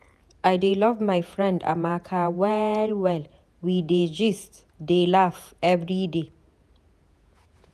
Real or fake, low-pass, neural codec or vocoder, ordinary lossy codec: fake; 14.4 kHz; vocoder, 48 kHz, 128 mel bands, Vocos; none